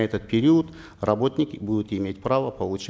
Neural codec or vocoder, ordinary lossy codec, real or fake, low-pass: none; none; real; none